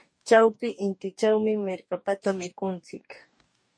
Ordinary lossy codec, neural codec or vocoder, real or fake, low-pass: MP3, 48 kbps; codec, 44.1 kHz, 2.6 kbps, DAC; fake; 9.9 kHz